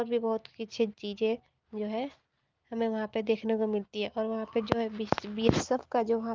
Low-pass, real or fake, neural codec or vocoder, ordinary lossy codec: 7.2 kHz; real; none; Opus, 32 kbps